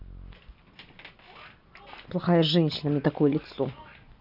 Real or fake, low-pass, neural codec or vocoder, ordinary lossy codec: real; 5.4 kHz; none; none